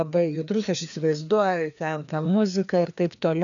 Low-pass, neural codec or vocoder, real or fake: 7.2 kHz; codec, 16 kHz, 2 kbps, FreqCodec, larger model; fake